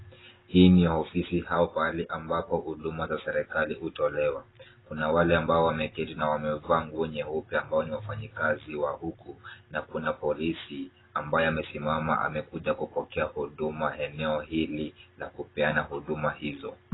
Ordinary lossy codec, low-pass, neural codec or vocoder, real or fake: AAC, 16 kbps; 7.2 kHz; none; real